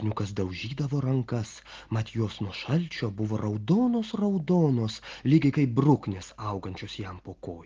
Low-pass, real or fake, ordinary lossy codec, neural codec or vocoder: 7.2 kHz; real; Opus, 16 kbps; none